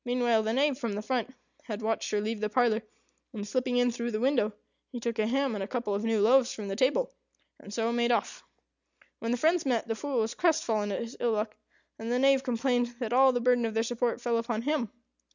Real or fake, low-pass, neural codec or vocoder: real; 7.2 kHz; none